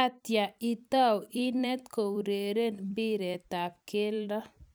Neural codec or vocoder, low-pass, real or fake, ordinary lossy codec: vocoder, 44.1 kHz, 128 mel bands every 256 samples, BigVGAN v2; none; fake; none